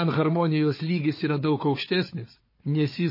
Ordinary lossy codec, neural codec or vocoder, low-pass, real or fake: MP3, 24 kbps; codec, 16 kHz, 4 kbps, FunCodec, trained on Chinese and English, 50 frames a second; 5.4 kHz; fake